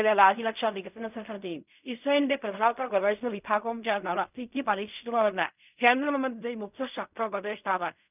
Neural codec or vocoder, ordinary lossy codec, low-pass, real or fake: codec, 16 kHz in and 24 kHz out, 0.4 kbps, LongCat-Audio-Codec, fine tuned four codebook decoder; none; 3.6 kHz; fake